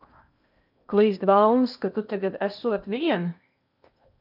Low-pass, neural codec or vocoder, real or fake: 5.4 kHz; codec, 16 kHz in and 24 kHz out, 0.8 kbps, FocalCodec, streaming, 65536 codes; fake